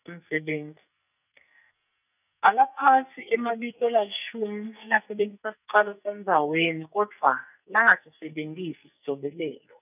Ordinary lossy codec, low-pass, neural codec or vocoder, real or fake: none; 3.6 kHz; codec, 44.1 kHz, 2.6 kbps, SNAC; fake